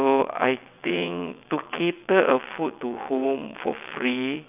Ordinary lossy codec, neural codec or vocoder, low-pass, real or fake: none; vocoder, 22.05 kHz, 80 mel bands, WaveNeXt; 3.6 kHz; fake